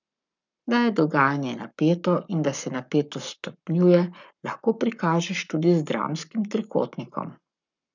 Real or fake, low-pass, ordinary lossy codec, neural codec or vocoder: fake; 7.2 kHz; none; codec, 44.1 kHz, 7.8 kbps, Pupu-Codec